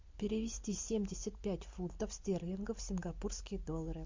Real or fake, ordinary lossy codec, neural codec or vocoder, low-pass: real; MP3, 48 kbps; none; 7.2 kHz